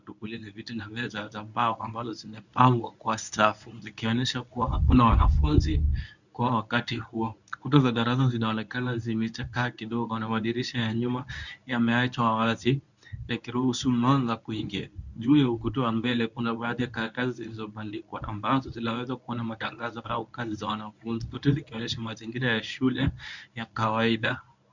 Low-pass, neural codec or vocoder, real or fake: 7.2 kHz; codec, 24 kHz, 0.9 kbps, WavTokenizer, medium speech release version 1; fake